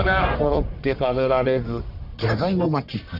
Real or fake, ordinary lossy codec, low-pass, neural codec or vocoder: fake; none; 5.4 kHz; codec, 44.1 kHz, 1.7 kbps, Pupu-Codec